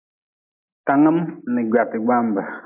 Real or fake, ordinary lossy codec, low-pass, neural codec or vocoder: real; AAC, 24 kbps; 3.6 kHz; none